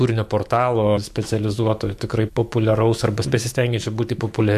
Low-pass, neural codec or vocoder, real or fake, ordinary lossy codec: 14.4 kHz; autoencoder, 48 kHz, 128 numbers a frame, DAC-VAE, trained on Japanese speech; fake; MP3, 64 kbps